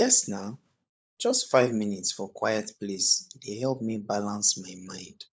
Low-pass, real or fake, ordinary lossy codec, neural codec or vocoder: none; fake; none; codec, 16 kHz, 16 kbps, FunCodec, trained on LibriTTS, 50 frames a second